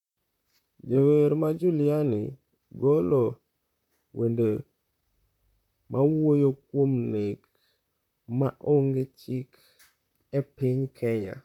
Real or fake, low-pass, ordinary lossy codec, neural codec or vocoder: fake; 19.8 kHz; none; vocoder, 44.1 kHz, 128 mel bands, Pupu-Vocoder